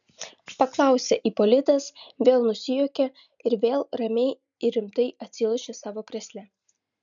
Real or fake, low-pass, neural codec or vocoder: real; 7.2 kHz; none